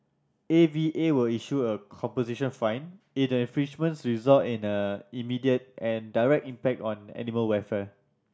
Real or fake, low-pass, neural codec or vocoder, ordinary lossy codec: real; none; none; none